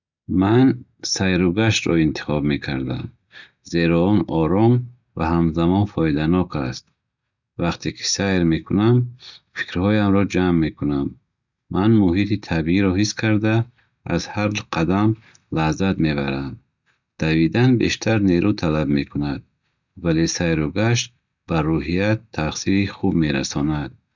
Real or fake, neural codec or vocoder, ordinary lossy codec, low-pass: real; none; none; 7.2 kHz